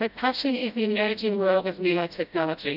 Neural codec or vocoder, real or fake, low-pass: codec, 16 kHz, 0.5 kbps, FreqCodec, smaller model; fake; 5.4 kHz